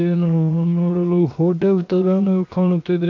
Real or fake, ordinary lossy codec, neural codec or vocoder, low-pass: fake; AAC, 48 kbps; codec, 16 kHz, 0.7 kbps, FocalCodec; 7.2 kHz